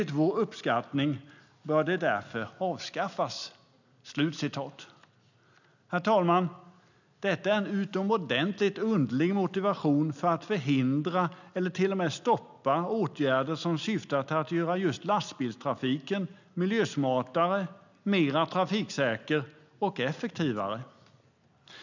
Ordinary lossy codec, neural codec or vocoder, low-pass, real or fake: none; none; 7.2 kHz; real